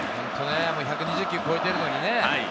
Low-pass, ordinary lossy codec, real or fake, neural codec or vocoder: none; none; real; none